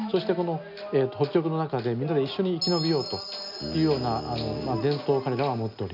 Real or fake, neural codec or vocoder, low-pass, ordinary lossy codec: real; none; 5.4 kHz; none